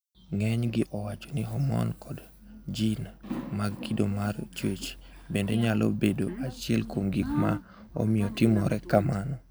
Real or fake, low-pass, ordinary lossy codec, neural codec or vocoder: real; none; none; none